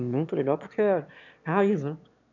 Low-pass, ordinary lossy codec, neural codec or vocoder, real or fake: 7.2 kHz; none; autoencoder, 22.05 kHz, a latent of 192 numbers a frame, VITS, trained on one speaker; fake